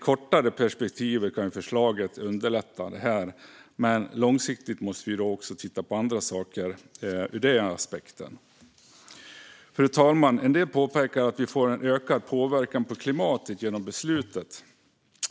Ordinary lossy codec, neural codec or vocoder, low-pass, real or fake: none; none; none; real